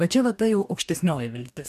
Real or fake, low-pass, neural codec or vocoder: fake; 14.4 kHz; codec, 44.1 kHz, 2.6 kbps, DAC